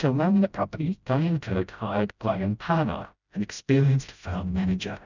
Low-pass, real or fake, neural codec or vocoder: 7.2 kHz; fake; codec, 16 kHz, 0.5 kbps, FreqCodec, smaller model